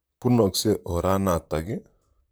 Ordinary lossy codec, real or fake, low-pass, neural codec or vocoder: none; fake; none; vocoder, 44.1 kHz, 128 mel bands, Pupu-Vocoder